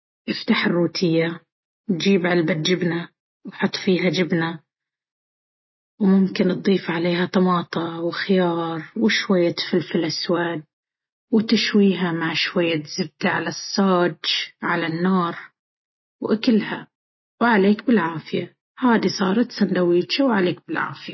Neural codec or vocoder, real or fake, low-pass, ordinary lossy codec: none; real; 7.2 kHz; MP3, 24 kbps